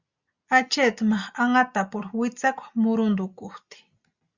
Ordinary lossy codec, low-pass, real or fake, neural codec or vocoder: Opus, 64 kbps; 7.2 kHz; real; none